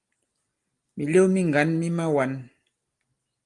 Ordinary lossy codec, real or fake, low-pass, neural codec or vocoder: Opus, 32 kbps; real; 10.8 kHz; none